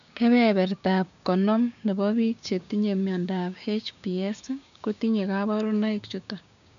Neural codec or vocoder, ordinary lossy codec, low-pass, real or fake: codec, 16 kHz, 6 kbps, DAC; none; 7.2 kHz; fake